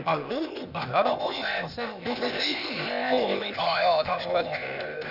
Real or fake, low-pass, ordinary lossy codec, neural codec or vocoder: fake; 5.4 kHz; none; codec, 16 kHz, 0.8 kbps, ZipCodec